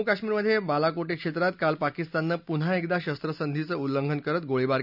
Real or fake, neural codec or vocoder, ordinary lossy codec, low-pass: real; none; none; 5.4 kHz